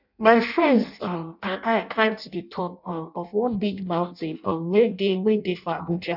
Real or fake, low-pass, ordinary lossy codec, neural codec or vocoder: fake; 5.4 kHz; none; codec, 16 kHz in and 24 kHz out, 0.6 kbps, FireRedTTS-2 codec